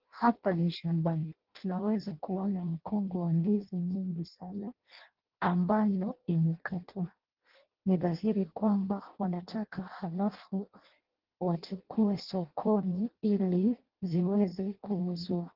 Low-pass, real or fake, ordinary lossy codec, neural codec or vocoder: 5.4 kHz; fake; Opus, 16 kbps; codec, 16 kHz in and 24 kHz out, 0.6 kbps, FireRedTTS-2 codec